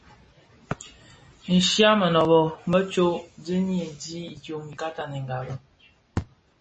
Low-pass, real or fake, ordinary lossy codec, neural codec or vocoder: 9.9 kHz; real; MP3, 32 kbps; none